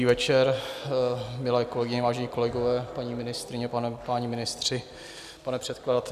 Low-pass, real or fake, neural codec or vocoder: 14.4 kHz; real; none